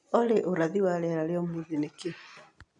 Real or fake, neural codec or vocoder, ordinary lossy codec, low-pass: real; none; none; 10.8 kHz